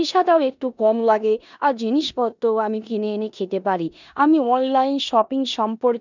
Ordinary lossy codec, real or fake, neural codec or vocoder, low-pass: none; fake; codec, 16 kHz in and 24 kHz out, 0.9 kbps, LongCat-Audio-Codec, four codebook decoder; 7.2 kHz